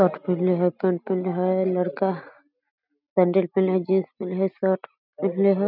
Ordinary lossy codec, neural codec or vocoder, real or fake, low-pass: none; none; real; 5.4 kHz